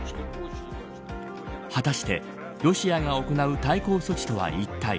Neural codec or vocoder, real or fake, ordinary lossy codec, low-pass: none; real; none; none